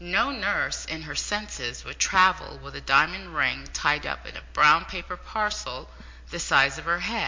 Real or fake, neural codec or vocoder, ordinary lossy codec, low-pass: real; none; MP3, 48 kbps; 7.2 kHz